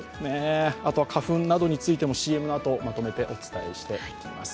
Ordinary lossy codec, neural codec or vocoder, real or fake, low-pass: none; none; real; none